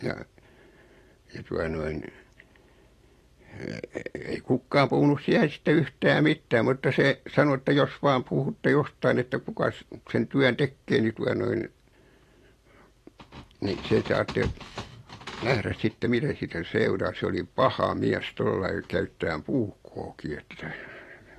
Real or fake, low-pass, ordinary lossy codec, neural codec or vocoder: fake; 14.4 kHz; AAC, 48 kbps; vocoder, 44.1 kHz, 128 mel bands every 256 samples, BigVGAN v2